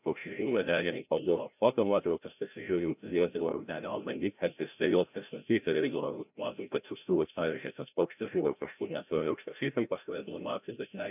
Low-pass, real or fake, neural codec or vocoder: 3.6 kHz; fake; codec, 16 kHz, 0.5 kbps, FreqCodec, larger model